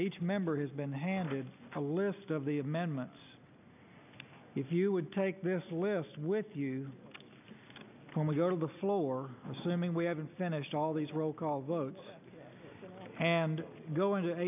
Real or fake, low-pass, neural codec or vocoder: real; 3.6 kHz; none